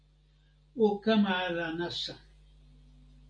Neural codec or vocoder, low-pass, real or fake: none; 9.9 kHz; real